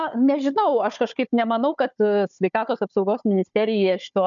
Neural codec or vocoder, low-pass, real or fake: codec, 16 kHz, 4 kbps, FunCodec, trained on Chinese and English, 50 frames a second; 7.2 kHz; fake